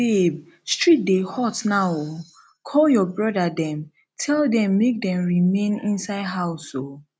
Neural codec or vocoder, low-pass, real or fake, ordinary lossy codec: none; none; real; none